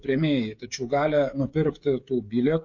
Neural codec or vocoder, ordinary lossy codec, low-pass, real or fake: codec, 16 kHz, 16 kbps, FreqCodec, smaller model; MP3, 48 kbps; 7.2 kHz; fake